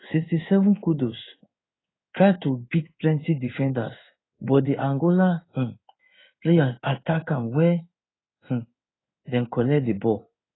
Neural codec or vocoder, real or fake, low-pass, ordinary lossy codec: codec, 16 kHz in and 24 kHz out, 1 kbps, XY-Tokenizer; fake; 7.2 kHz; AAC, 16 kbps